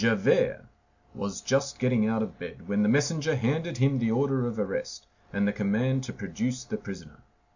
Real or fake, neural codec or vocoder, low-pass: real; none; 7.2 kHz